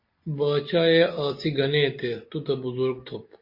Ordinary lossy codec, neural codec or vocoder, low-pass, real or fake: MP3, 32 kbps; none; 5.4 kHz; real